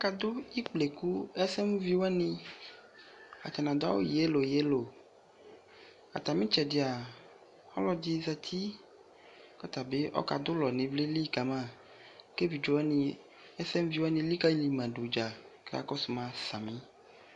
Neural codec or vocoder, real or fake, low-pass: none; real; 10.8 kHz